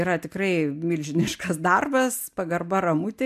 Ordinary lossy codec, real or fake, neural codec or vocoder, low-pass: MP3, 96 kbps; real; none; 14.4 kHz